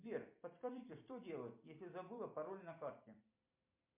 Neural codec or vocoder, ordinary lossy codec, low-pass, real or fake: vocoder, 44.1 kHz, 128 mel bands every 256 samples, BigVGAN v2; MP3, 32 kbps; 3.6 kHz; fake